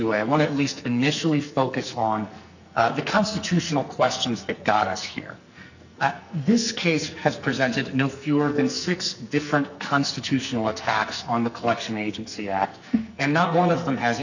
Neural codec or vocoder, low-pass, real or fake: codec, 44.1 kHz, 2.6 kbps, SNAC; 7.2 kHz; fake